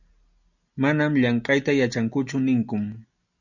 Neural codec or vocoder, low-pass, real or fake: none; 7.2 kHz; real